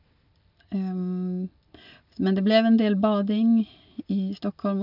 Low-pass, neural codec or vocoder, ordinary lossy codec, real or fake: 5.4 kHz; none; none; real